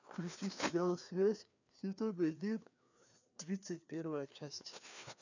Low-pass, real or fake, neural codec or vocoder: 7.2 kHz; fake; codec, 16 kHz, 2 kbps, FreqCodec, larger model